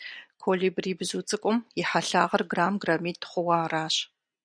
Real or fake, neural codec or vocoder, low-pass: real; none; 9.9 kHz